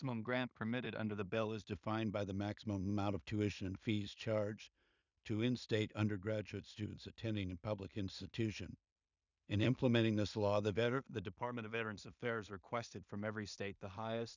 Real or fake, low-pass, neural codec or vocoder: fake; 7.2 kHz; codec, 16 kHz in and 24 kHz out, 0.4 kbps, LongCat-Audio-Codec, two codebook decoder